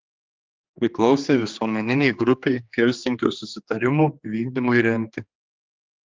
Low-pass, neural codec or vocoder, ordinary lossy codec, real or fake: 7.2 kHz; codec, 16 kHz, 2 kbps, X-Codec, HuBERT features, trained on general audio; Opus, 32 kbps; fake